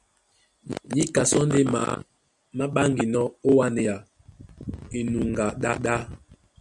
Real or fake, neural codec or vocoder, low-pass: real; none; 10.8 kHz